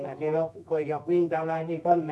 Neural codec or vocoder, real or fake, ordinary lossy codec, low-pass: codec, 24 kHz, 0.9 kbps, WavTokenizer, medium music audio release; fake; none; none